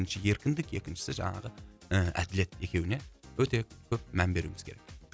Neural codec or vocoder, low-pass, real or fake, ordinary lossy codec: none; none; real; none